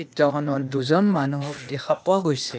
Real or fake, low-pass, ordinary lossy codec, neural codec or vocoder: fake; none; none; codec, 16 kHz, 0.8 kbps, ZipCodec